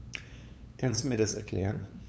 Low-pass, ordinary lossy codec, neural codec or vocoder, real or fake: none; none; codec, 16 kHz, 8 kbps, FunCodec, trained on LibriTTS, 25 frames a second; fake